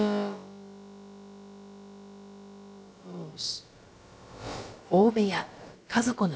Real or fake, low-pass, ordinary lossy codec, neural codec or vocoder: fake; none; none; codec, 16 kHz, about 1 kbps, DyCAST, with the encoder's durations